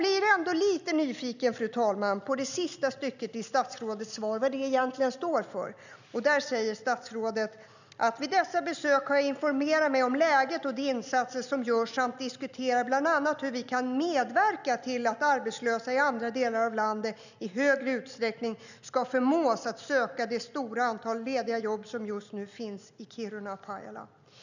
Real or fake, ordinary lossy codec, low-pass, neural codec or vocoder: real; none; 7.2 kHz; none